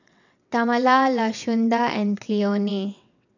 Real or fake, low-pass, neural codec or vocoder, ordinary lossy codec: fake; 7.2 kHz; vocoder, 22.05 kHz, 80 mel bands, WaveNeXt; none